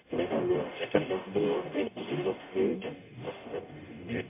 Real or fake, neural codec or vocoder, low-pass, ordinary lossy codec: fake; codec, 44.1 kHz, 0.9 kbps, DAC; 3.6 kHz; MP3, 24 kbps